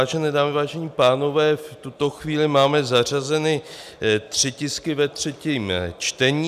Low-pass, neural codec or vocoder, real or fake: 14.4 kHz; none; real